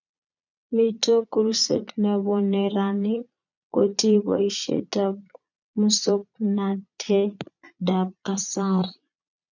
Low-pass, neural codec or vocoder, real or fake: 7.2 kHz; vocoder, 22.05 kHz, 80 mel bands, Vocos; fake